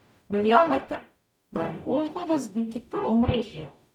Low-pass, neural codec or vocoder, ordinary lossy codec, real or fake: 19.8 kHz; codec, 44.1 kHz, 0.9 kbps, DAC; none; fake